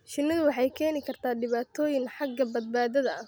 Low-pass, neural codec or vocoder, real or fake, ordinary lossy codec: none; none; real; none